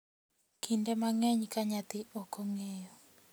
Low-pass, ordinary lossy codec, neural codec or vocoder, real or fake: none; none; none; real